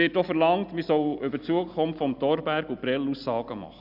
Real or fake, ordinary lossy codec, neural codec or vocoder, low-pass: real; none; none; 5.4 kHz